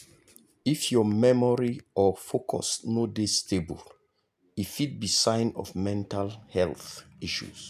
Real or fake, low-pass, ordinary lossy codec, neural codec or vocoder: real; 14.4 kHz; none; none